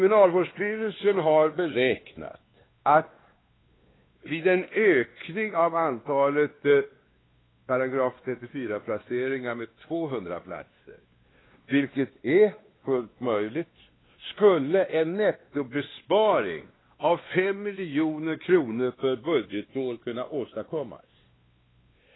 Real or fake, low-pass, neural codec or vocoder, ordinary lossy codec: fake; 7.2 kHz; codec, 16 kHz, 2 kbps, X-Codec, WavLM features, trained on Multilingual LibriSpeech; AAC, 16 kbps